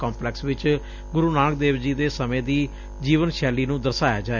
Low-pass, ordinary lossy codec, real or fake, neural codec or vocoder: 7.2 kHz; none; real; none